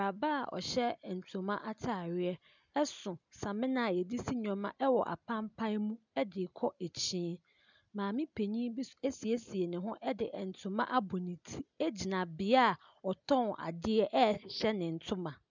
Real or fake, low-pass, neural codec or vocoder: real; 7.2 kHz; none